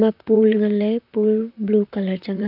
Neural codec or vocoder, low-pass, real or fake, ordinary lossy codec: vocoder, 44.1 kHz, 128 mel bands, Pupu-Vocoder; 5.4 kHz; fake; AAC, 48 kbps